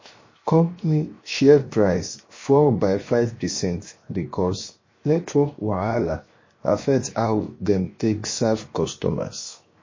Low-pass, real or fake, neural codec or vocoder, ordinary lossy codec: 7.2 kHz; fake; codec, 16 kHz, 0.7 kbps, FocalCodec; MP3, 32 kbps